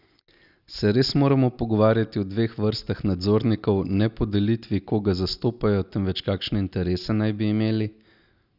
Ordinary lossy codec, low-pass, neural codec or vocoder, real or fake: none; 5.4 kHz; none; real